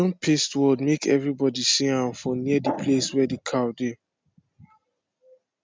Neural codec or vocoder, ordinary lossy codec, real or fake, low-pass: none; none; real; none